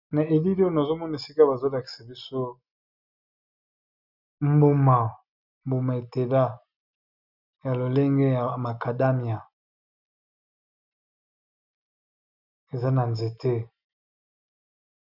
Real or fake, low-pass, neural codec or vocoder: real; 5.4 kHz; none